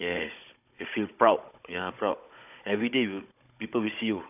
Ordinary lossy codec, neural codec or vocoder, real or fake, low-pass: none; codec, 16 kHz, 16 kbps, FunCodec, trained on Chinese and English, 50 frames a second; fake; 3.6 kHz